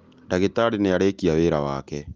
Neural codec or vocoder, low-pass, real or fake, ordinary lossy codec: none; 7.2 kHz; real; Opus, 32 kbps